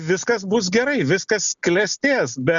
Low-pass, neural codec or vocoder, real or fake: 7.2 kHz; none; real